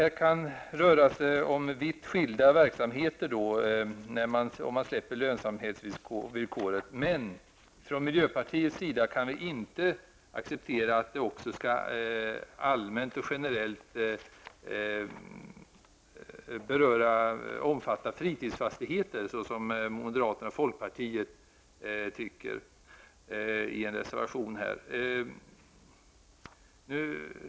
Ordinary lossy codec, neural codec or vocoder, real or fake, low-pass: none; none; real; none